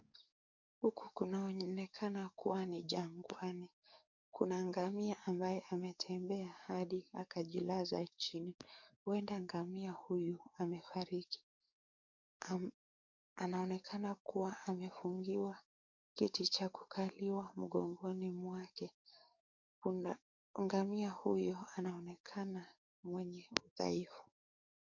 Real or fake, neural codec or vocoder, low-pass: fake; codec, 44.1 kHz, 7.8 kbps, DAC; 7.2 kHz